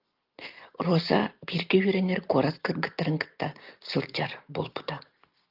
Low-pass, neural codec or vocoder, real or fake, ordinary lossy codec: 5.4 kHz; none; real; Opus, 32 kbps